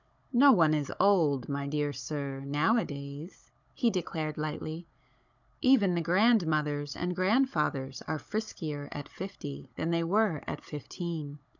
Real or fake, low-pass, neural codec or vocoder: fake; 7.2 kHz; codec, 16 kHz, 16 kbps, FunCodec, trained on Chinese and English, 50 frames a second